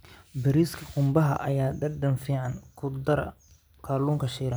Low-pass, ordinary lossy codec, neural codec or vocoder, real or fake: none; none; none; real